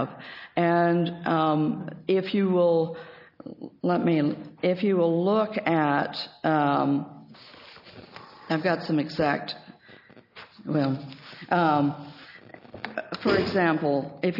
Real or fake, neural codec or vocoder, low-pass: real; none; 5.4 kHz